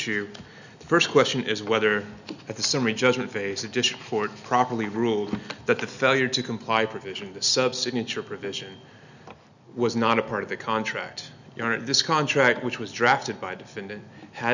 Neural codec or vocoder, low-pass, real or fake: none; 7.2 kHz; real